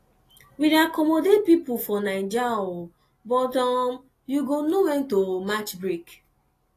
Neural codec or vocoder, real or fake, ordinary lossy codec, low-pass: none; real; AAC, 48 kbps; 14.4 kHz